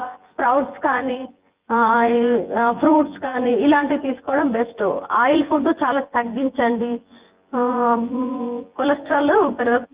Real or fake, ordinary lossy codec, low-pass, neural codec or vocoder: fake; Opus, 24 kbps; 3.6 kHz; vocoder, 24 kHz, 100 mel bands, Vocos